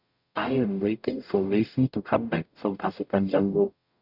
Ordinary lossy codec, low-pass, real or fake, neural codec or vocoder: none; 5.4 kHz; fake; codec, 44.1 kHz, 0.9 kbps, DAC